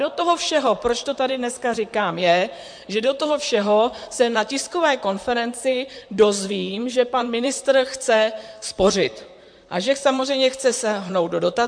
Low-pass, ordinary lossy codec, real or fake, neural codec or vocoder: 9.9 kHz; MP3, 64 kbps; fake; vocoder, 44.1 kHz, 128 mel bands, Pupu-Vocoder